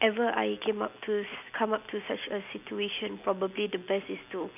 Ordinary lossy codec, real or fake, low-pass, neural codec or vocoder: none; real; 3.6 kHz; none